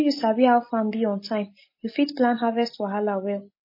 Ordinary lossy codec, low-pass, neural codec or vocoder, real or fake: MP3, 24 kbps; 5.4 kHz; none; real